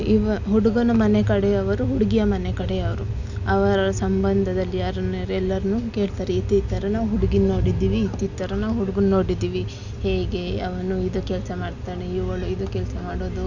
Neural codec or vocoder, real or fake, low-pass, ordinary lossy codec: none; real; 7.2 kHz; none